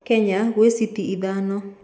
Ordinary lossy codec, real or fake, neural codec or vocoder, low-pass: none; real; none; none